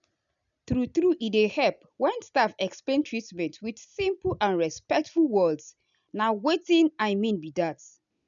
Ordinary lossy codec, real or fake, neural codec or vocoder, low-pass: none; real; none; 7.2 kHz